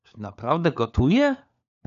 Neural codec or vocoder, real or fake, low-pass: codec, 16 kHz, 4 kbps, FunCodec, trained on LibriTTS, 50 frames a second; fake; 7.2 kHz